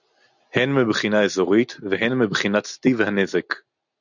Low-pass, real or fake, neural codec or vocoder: 7.2 kHz; real; none